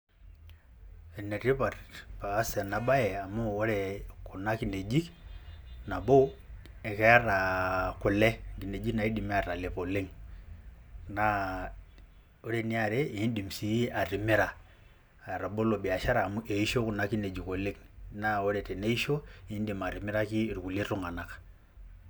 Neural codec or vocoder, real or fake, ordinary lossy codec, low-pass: none; real; none; none